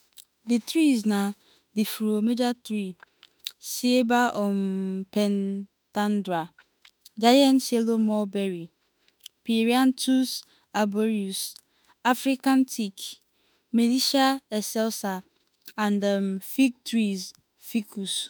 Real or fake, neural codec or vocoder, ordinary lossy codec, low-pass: fake; autoencoder, 48 kHz, 32 numbers a frame, DAC-VAE, trained on Japanese speech; none; none